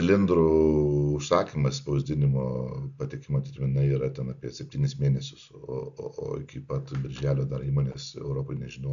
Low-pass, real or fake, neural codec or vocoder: 7.2 kHz; real; none